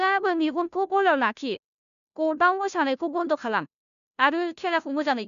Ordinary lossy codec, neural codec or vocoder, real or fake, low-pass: none; codec, 16 kHz, 0.5 kbps, FunCodec, trained on Chinese and English, 25 frames a second; fake; 7.2 kHz